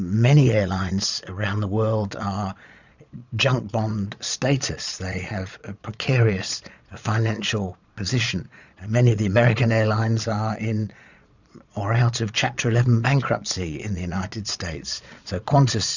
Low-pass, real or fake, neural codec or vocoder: 7.2 kHz; fake; vocoder, 22.05 kHz, 80 mel bands, Vocos